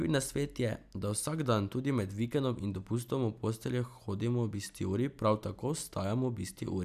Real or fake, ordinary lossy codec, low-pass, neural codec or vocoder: real; none; 14.4 kHz; none